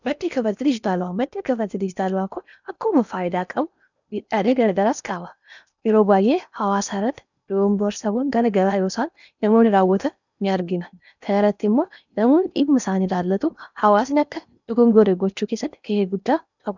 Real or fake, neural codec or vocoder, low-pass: fake; codec, 16 kHz in and 24 kHz out, 0.8 kbps, FocalCodec, streaming, 65536 codes; 7.2 kHz